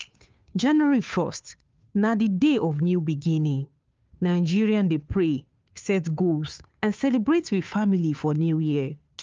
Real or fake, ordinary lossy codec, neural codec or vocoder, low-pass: fake; Opus, 24 kbps; codec, 16 kHz, 2 kbps, FunCodec, trained on Chinese and English, 25 frames a second; 7.2 kHz